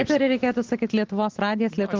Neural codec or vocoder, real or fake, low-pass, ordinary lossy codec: none; real; 7.2 kHz; Opus, 16 kbps